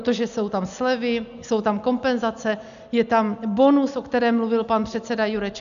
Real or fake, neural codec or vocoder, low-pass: real; none; 7.2 kHz